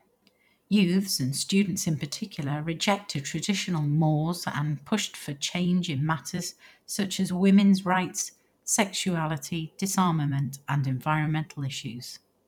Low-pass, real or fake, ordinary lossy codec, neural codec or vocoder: 19.8 kHz; fake; none; vocoder, 44.1 kHz, 128 mel bands every 512 samples, BigVGAN v2